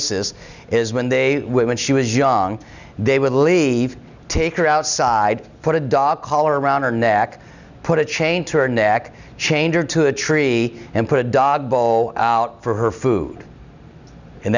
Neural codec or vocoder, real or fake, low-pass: none; real; 7.2 kHz